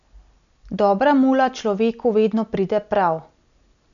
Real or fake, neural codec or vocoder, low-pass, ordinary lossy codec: real; none; 7.2 kHz; none